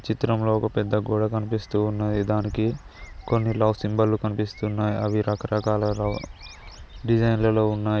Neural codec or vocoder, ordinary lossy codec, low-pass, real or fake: none; none; none; real